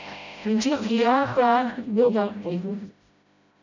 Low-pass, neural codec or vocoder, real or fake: 7.2 kHz; codec, 16 kHz, 0.5 kbps, FreqCodec, smaller model; fake